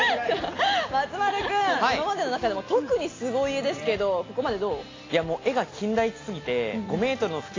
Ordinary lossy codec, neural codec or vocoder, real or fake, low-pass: AAC, 32 kbps; none; real; 7.2 kHz